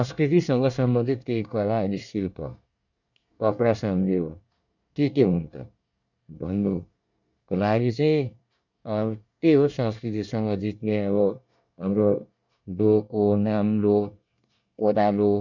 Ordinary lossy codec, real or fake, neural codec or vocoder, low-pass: none; fake; codec, 24 kHz, 1 kbps, SNAC; 7.2 kHz